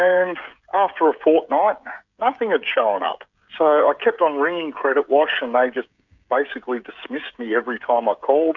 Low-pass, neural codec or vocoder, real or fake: 7.2 kHz; codec, 16 kHz, 16 kbps, FreqCodec, smaller model; fake